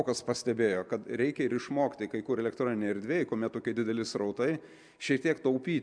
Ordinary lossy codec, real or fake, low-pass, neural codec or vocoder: MP3, 96 kbps; real; 9.9 kHz; none